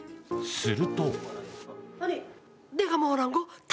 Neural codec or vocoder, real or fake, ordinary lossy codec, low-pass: none; real; none; none